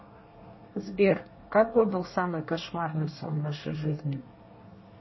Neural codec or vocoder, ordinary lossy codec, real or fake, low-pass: codec, 24 kHz, 1 kbps, SNAC; MP3, 24 kbps; fake; 7.2 kHz